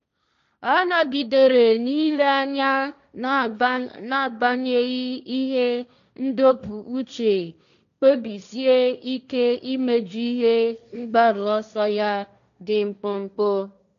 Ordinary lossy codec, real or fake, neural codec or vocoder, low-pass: none; fake; codec, 16 kHz, 1.1 kbps, Voila-Tokenizer; 7.2 kHz